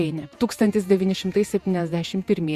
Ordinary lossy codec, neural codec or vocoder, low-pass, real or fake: Opus, 64 kbps; vocoder, 48 kHz, 128 mel bands, Vocos; 14.4 kHz; fake